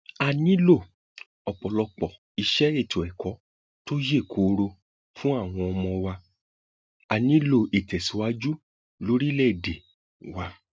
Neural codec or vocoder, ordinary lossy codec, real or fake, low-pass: none; none; real; none